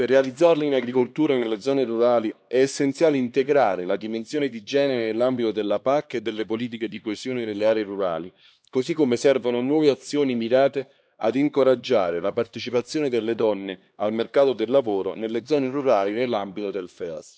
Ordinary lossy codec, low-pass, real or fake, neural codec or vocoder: none; none; fake; codec, 16 kHz, 2 kbps, X-Codec, HuBERT features, trained on LibriSpeech